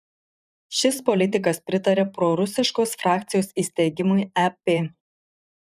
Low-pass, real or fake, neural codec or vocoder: 14.4 kHz; fake; vocoder, 44.1 kHz, 128 mel bands every 512 samples, BigVGAN v2